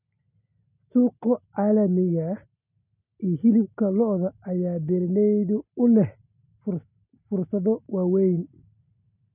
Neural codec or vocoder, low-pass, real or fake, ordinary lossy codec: none; 3.6 kHz; real; none